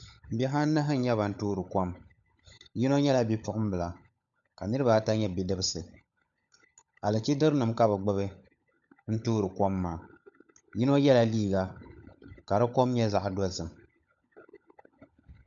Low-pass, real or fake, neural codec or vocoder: 7.2 kHz; fake; codec, 16 kHz, 16 kbps, FunCodec, trained on LibriTTS, 50 frames a second